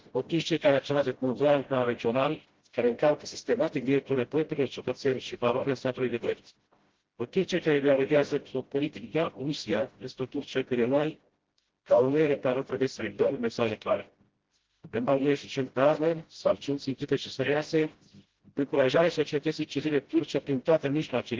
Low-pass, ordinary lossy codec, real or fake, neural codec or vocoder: 7.2 kHz; Opus, 16 kbps; fake; codec, 16 kHz, 0.5 kbps, FreqCodec, smaller model